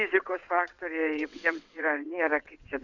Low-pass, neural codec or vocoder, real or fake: 7.2 kHz; vocoder, 22.05 kHz, 80 mel bands, WaveNeXt; fake